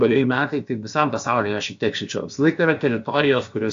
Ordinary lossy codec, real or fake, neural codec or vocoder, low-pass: AAC, 64 kbps; fake; codec, 16 kHz, about 1 kbps, DyCAST, with the encoder's durations; 7.2 kHz